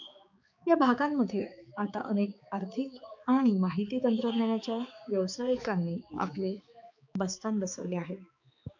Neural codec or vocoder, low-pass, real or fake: codec, 16 kHz, 4 kbps, X-Codec, HuBERT features, trained on balanced general audio; 7.2 kHz; fake